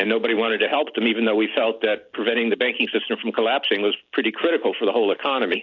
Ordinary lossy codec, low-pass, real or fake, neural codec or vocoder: AAC, 48 kbps; 7.2 kHz; real; none